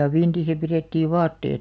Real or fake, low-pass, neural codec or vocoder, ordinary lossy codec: real; none; none; none